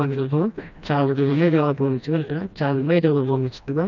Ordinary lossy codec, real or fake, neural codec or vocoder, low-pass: none; fake; codec, 16 kHz, 1 kbps, FreqCodec, smaller model; 7.2 kHz